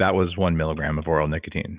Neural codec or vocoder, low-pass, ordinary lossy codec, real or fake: none; 3.6 kHz; Opus, 64 kbps; real